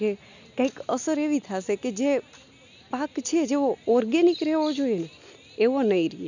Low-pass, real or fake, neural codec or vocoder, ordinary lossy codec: 7.2 kHz; real; none; none